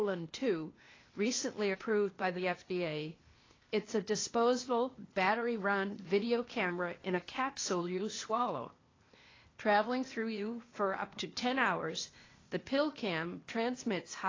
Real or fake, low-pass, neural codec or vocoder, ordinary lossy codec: fake; 7.2 kHz; codec, 16 kHz, 0.8 kbps, ZipCodec; AAC, 32 kbps